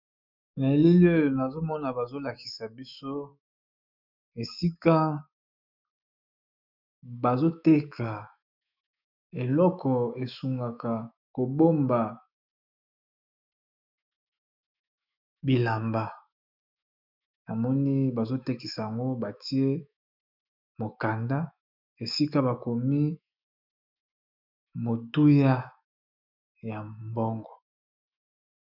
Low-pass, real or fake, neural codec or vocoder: 5.4 kHz; fake; autoencoder, 48 kHz, 128 numbers a frame, DAC-VAE, trained on Japanese speech